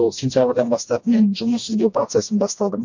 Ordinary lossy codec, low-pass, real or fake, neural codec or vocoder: MP3, 48 kbps; 7.2 kHz; fake; codec, 16 kHz, 1 kbps, FreqCodec, smaller model